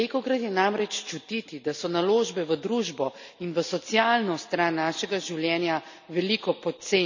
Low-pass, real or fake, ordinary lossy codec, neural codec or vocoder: 7.2 kHz; real; none; none